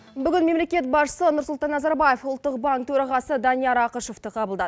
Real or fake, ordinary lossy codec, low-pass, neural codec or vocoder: real; none; none; none